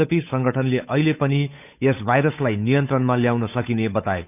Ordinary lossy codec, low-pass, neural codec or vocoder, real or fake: none; 3.6 kHz; codec, 16 kHz, 8 kbps, FunCodec, trained on Chinese and English, 25 frames a second; fake